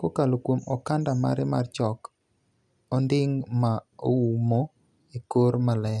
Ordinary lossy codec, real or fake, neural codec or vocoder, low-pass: none; real; none; none